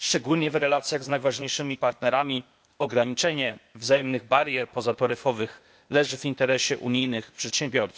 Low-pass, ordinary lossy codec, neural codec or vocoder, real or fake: none; none; codec, 16 kHz, 0.8 kbps, ZipCodec; fake